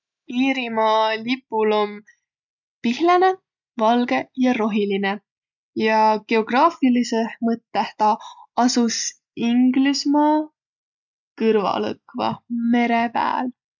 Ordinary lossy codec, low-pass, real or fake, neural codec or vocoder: none; 7.2 kHz; real; none